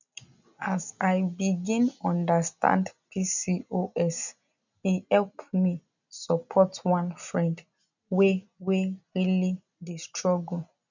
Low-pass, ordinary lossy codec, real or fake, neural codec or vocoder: 7.2 kHz; none; real; none